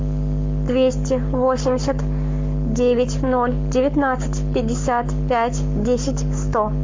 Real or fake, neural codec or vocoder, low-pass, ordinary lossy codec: fake; codec, 44.1 kHz, 7.8 kbps, Pupu-Codec; 7.2 kHz; MP3, 48 kbps